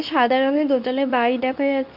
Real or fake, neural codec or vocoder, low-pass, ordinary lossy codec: fake; codec, 24 kHz, 0.9 kbps, WavTokenizer, medium speech release version 2; 5.4 kHz; none